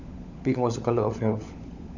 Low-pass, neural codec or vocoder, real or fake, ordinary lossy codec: 7.2 kHz; codec, 16 kHz, 16 kbps, FunCodec, trained on LibriTTS, 50 frames a second; fake; none